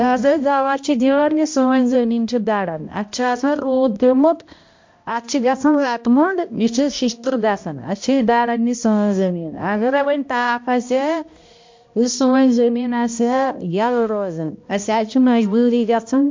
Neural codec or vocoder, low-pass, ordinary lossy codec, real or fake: codec, 16 kHz, 1 kbps, X-Codec, HuBERT features, trained on balanced general audio; 7.2 kHz; MP3, 48 kbps; fake